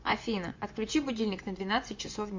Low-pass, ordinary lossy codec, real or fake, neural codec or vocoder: 7.2 kHz; MP3, 48 kbps; fake; vocoder, 24 kHz, 100 mel bands, Vocos